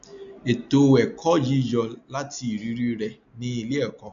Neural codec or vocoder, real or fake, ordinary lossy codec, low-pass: none; real; none; 7.2 kHz